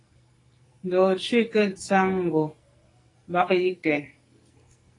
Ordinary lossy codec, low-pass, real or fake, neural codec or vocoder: AAC, 32 kbps; 10.8 kHz; fake; codec, 44.1 kHz, 2.6 kbps, SNAC